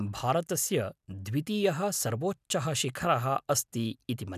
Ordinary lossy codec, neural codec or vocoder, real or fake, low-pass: none; none; real; 14.4 kHz